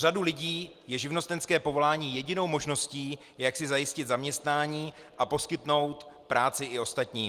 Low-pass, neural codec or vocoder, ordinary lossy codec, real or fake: 14.4 kHz; none; Opus, 24 kbps; real